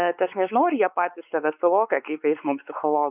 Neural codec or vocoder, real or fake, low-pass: codec, 16 kHz, 4 kbps, X-Codec, WavLM features, trained on Multilingual LibriSpeech; fake; 3.6 kHz